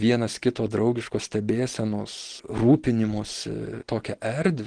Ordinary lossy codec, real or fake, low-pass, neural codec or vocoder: Opus, 16 kbps; real; 9.9 kHz; none